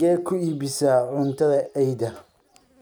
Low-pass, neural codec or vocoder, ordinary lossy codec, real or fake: none; none; none; real